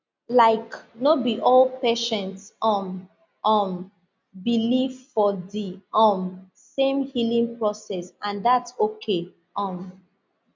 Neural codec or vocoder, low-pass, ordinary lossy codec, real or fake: none; 7.2 kHz; MP3, 64 kbps; real